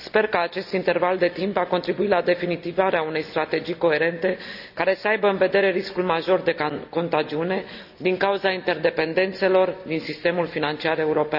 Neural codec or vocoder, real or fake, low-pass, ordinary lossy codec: none; real; 5.4 kHz; none